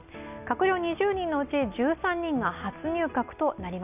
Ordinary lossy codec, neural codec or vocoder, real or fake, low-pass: none; none; real; 3.6 kHz